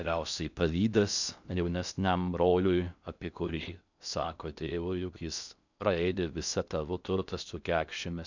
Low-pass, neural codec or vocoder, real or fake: 7.2 kHz; codec, 16 kHz in and 24 kHz out, 0.6 kbps, FocalCodec, streaming, 2048 codes; fake